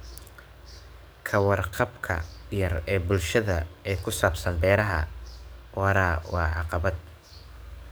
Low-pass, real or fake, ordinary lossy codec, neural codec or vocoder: none; fake; none; codec, 44.1 kHz, 7.8 kbps, DAC